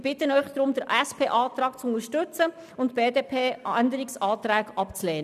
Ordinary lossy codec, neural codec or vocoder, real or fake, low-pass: none; none; real; 14.4 kHz